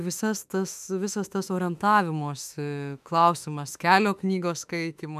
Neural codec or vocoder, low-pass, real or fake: autoencoder, 48 kHz, 32 numbers a frame, DAC-VAE, trained on Japanese speech; 14.4 kHz; fake